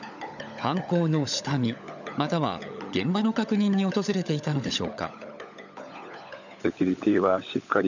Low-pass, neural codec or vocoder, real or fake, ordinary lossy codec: 7.2 kHz; codec, 16 kHz, 16 kbps, FunCodec, trained on LibriTTS, 50 frames a second; fake; none